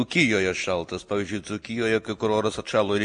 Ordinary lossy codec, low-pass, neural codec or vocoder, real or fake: MP3, 48 kbps; 9.9 kHz; none; real